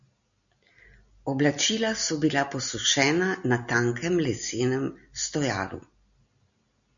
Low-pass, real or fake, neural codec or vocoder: 7.2 kHz; real; none